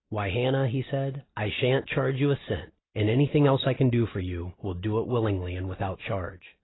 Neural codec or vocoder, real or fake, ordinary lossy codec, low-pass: none; real; AAC, 16 kbps; 7.2 kHz